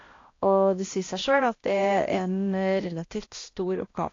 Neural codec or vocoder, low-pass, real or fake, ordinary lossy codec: codec, 16 kHz, 1 kbps, X-Codec, HuBERT features, trained on balanced general audio; 7.2 kHz; fake; AAC, 32 kbps